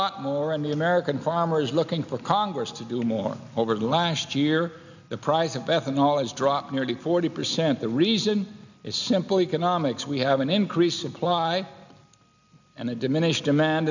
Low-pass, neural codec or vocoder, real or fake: 7.2 kHz; none; real